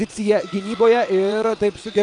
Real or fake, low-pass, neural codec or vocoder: fake; 9.9 kHz; vocoder, 22.05 kHz, 80 mel bands, WaveNeXt